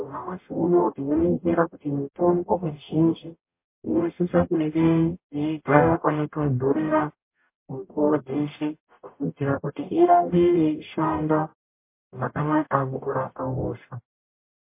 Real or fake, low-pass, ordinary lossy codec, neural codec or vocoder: fake; 3.6 kHz; MP3, 24 kbps; codec, 44.1 kHz, 0.9 kbps, DAC